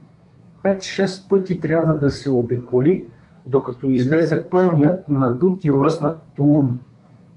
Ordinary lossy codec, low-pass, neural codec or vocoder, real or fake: MP3, 64 kbps; 10.8 kHz; codec, 24 kHz, 1 kbps, SNAC; fake